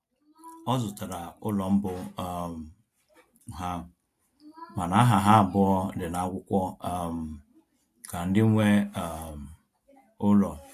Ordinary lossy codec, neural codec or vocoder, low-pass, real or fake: AAC, 64 kbps; none; 14.4 kHz; real